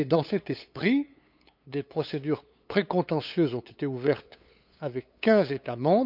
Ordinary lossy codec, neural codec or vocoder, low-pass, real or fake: none; codec, 16 kHz, 8 kbps, FunCodec, trained on LibriTTS, 25 frames a second; 5.4 kHz; fake